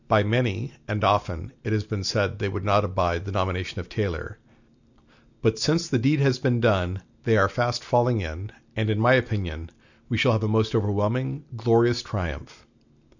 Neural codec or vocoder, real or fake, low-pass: none; real; 7.2 kHz